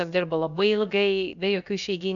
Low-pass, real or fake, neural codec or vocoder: 7.2 kHz; fake; codec, 16 kHz, about 1 kbps, DyCAST, with the encoder's durations